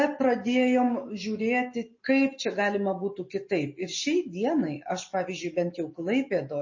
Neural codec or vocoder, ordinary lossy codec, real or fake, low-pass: none; MP3, 32 kbps; real; 7.2 kHz